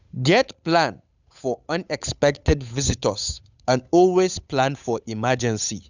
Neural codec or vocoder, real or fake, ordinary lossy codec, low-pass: codec, 16 kHz, 8 kbps, FunCodec, trained on Chinese and English, 25 frames a second; fake; none; 7.2 kHz